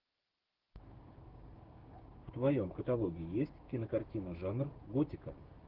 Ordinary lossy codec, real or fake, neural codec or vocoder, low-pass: Opus, 16 kbps; fake; codec, 16 kHz, 6 kbps, DAC; 5.4 kHz